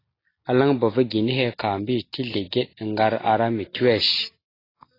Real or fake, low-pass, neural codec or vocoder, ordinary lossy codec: real; 5.4 kHz; none; AAC, 24 kbps